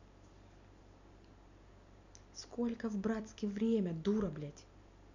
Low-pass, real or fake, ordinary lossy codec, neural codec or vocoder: 7.2 kHz; real; none; none